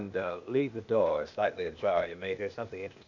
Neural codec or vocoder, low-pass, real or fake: codec, 16 kHz, 0.8 kbps, ZipCodec; 7.2 kHz; fake